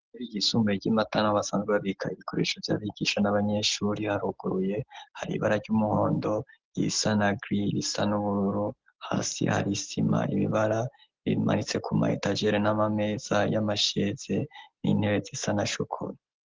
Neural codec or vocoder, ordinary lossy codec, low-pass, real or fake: none; Opus, 16 kbps; 7.2 kHz; real